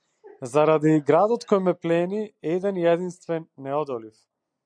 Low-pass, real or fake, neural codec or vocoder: 9.9 kHz; real; none